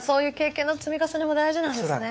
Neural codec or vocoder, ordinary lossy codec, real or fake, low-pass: codec, 16 kHz, 4 kbps, X-Codec, WavLM features, trained on Multilingual LibriSpeech; none; fake; none